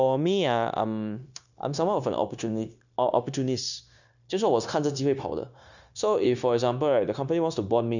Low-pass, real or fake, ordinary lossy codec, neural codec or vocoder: 7.2 kHz; fake; none; codec, 16 kHz, 0.9 kbps, LongCat-Audio-Codec